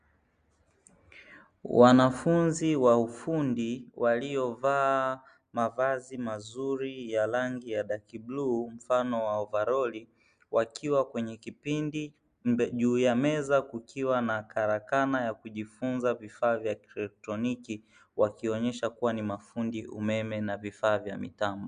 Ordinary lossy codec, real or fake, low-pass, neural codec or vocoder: Opus, 64 kbps; real; 9.9 kHz; none